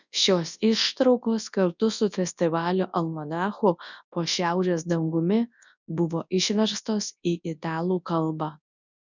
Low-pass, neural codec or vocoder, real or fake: 7.2 kHz; codec, 24 kHz, 0.9 kbps, WavTokenizer, large speech release; fake